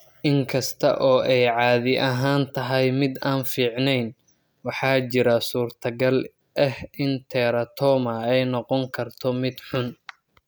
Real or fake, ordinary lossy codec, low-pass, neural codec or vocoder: real; none; none; none